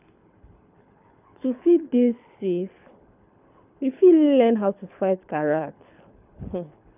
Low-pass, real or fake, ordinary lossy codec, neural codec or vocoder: 3.6 kHz; fake; none; codec, 24 kHz, 6 kbps, HILCodec